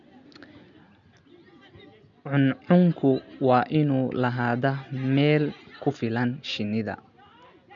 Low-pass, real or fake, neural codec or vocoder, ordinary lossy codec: 7.2 kHz; real; none; MP3, 96 kbps